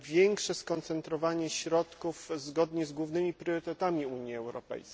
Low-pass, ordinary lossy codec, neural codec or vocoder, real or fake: none; none; none; real